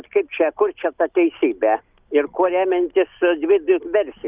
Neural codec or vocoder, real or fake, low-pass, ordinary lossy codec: none; real; 3.6 kHz; Opus, 24 kbps